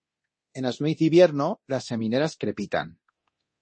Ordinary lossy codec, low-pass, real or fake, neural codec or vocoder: MP3, 32 kbps; 9.9 kHz; fake; codec, 24 kHz, 0.9 kbps, DualCodec